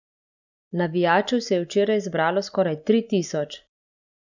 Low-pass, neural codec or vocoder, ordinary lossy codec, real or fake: 7.2 kHz; none; none; real